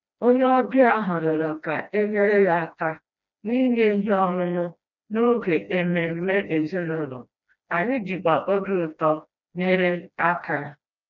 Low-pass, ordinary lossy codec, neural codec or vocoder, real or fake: 7.2 kHz; none; codec, 16 kHz, 1 kbps, FreqCodec, smaller model; fake